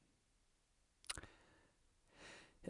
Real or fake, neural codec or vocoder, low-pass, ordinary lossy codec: real; none; 10.8 kHz; none